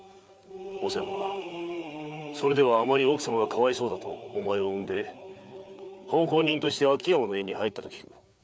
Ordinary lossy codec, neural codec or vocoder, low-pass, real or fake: none; codec, 16 kHz, 4 kbps, FreqCodec, larger model; none; fake